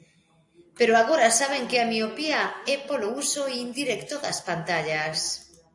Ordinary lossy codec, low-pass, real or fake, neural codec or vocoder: AAC, 48 kbps; 10.8 kHz; real; none